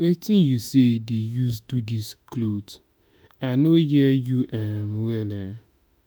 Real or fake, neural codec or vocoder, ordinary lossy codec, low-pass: fake; autoencoder, 48 kHz, 32 numbers a frame, DAC-VAE, trained on Japanese speech; none; none